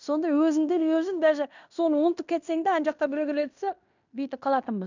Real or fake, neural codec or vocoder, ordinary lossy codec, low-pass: fake; codec, 16 kHz in and 24 kHz out, 0.9 kbps, LongCat-Audio-Codec, fine tuned four codebook decoder; none; 7.2 kHz